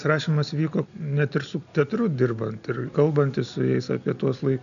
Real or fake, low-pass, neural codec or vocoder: real; 7.2 kHz; none